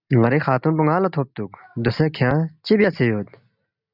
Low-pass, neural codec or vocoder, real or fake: 5.4 kHz; none; real